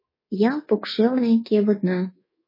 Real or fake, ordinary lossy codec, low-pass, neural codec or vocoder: fake; MP3, 24 kbps; 5.4 kHz; codec, 24 kHz, 1.2 kbps, DualCodec